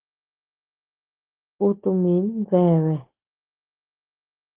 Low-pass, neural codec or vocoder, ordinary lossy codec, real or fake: 3.6 kHz; none; Opus, 16 kbps; real